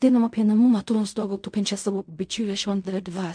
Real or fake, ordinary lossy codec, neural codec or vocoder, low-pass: fake; MP3, 64 kbps; codec, 16 kHz in and 24 kHz out, 0.4 kbps, LongCat-Audio-Codec, fine tuned four codebook decoder; 9.9 kHz